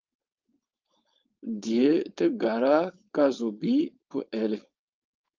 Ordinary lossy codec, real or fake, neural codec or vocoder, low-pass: Opus, 24 kbps; fake; codec, 16 kHz, 4.8 kbps, FACodec; 7.2 kHz